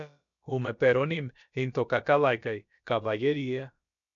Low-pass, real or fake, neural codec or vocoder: 7.2 kHz; fake; codec, 16 kHz, about 1 kbps, DyCAST, with the encoder's durations